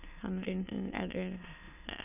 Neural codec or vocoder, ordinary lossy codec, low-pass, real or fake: autoencoder, 22.05 kHz, a latent of 192 numbers a frame, VITS, trained on many speakers; none; 3.6 kHz; fake